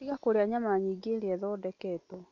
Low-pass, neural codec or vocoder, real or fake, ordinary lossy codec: 7.2 kHz; none; real; none